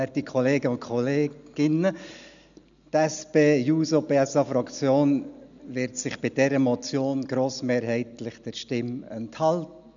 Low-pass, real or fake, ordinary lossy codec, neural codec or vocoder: 7.2 kHz; real; none; none